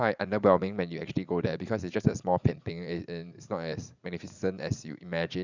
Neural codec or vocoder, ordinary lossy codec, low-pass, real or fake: vocoder, 44.1 kHz, 80 mel bands, Vocos; none; 7.2 kHz; fake